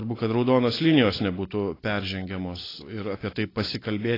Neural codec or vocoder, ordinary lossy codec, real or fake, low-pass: none; AAC, 24 kbps; real; 5.4 kHz